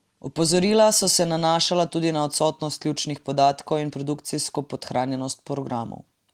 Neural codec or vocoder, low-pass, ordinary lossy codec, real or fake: none; 19.8 kHz; Opus, 24 kbps; real